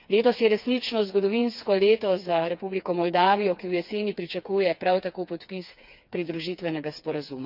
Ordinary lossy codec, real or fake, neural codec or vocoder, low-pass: none; fake; codec, 16 kHz, 4 kbps, FreqCodec, smaller model; 5.4 kHz